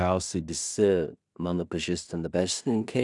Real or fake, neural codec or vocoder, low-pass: fake; codec, 16 kHz in and 24 kHz out, 0.4 kbps, LongCat-Audio-Codec, two codebook decoder; 10.8 kHz